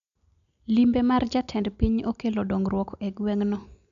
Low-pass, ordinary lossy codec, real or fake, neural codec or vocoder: 7.2 kHz; MP3, 96 kbps; real; none